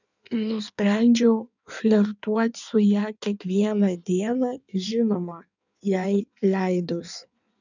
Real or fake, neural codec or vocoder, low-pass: fake; codec, 16 kHz in and 24 kHz out, 1.1 kbps, FireRedTTS-2 codec; 7.2 kHz